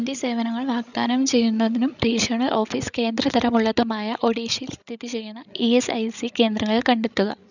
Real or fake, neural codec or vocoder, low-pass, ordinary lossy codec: fake; codec, 16 kHz, 16 kbps, FreqCodec, larger model; 7.2 kHz; none